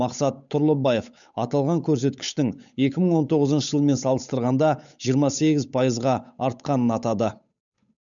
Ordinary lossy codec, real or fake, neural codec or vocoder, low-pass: none; fake; codec, 16 kHz, 8 kbps, FunCodec, trained on Chinese and English, 25 frames a second; 7.2 kHz